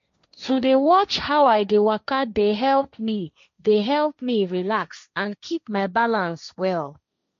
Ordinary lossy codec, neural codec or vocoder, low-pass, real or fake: MP3, 48 kbps; codec, 16 kHz, 1.1 kbps, Voila-Tokenizer; 7.2 kHz; fake